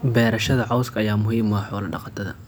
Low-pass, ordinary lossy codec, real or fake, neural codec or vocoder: none; none; real; none